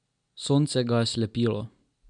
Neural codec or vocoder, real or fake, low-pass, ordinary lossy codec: none; real; 9.9 kHz; none